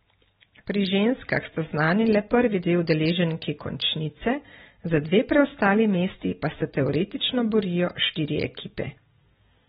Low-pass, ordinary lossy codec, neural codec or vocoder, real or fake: 19.8 kHz; AAC, 16 kbps; none; real